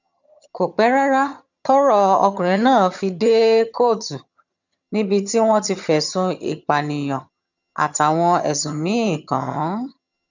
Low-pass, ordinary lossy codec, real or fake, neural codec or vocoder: 7.2 kHz; none; fake; vocoder, 22.05 kHz, 80 mel bands, HiFi-GAN